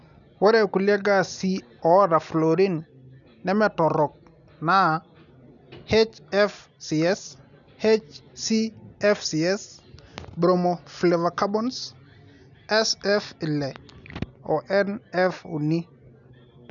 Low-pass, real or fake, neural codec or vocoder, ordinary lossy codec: 7.2 kHz; real; none; none